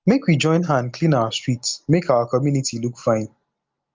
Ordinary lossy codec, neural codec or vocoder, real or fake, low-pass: Opus, 32 kbps; none; real; 7.2 kHz